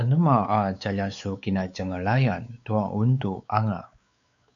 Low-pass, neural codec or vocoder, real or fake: 7.2 kHz; codec, 16 kHz, 4 kbps, X-Codec, WavLM features, trained on Multilingual LibriSpeech; fake